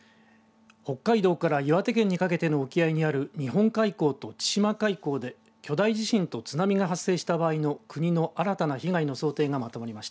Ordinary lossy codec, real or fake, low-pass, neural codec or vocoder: none; real; none; none